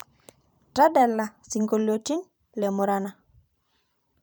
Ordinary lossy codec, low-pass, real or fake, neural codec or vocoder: none; none; fake; vocoder, 44.1 kHz, 128 mel bands every 512 samples, BigVGAN v2